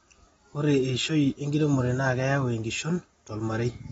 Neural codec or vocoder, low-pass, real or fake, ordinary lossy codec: none; 9.9 kHz; real; AAC, 24 kbps